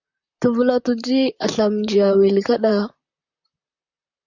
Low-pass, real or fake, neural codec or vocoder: 7.2 kHz; fake; vocoder, 44.1 kHz, 128 mel bands, Pupu-Vocoder